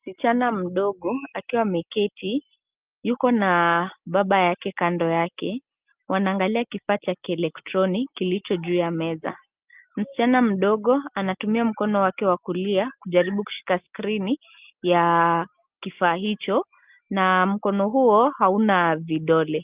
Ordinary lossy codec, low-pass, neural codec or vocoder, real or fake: Opus, 32 kbps; 3.6 kHz; none; real